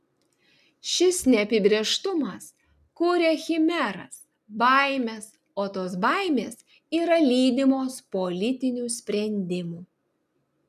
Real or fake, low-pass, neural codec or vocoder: real; 14.4 kHz; none